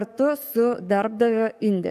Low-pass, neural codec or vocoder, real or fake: 14.4 kHz; codec, 44.1 kHz, 7.8 kbps, DAC; fake